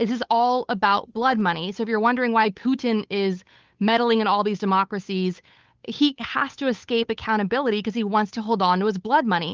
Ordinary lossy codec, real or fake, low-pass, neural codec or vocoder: Opus, 24 kbps; fake; 7.2 kHz; codec, 16 kHz, 8 kbps, FunCodec, trained on Chinese and English, 25 frames a second